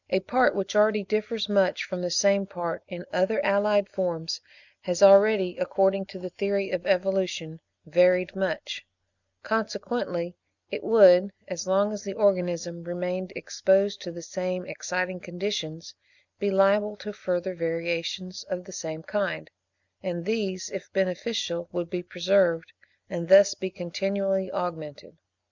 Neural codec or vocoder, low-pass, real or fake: none; 7.2 kHz; real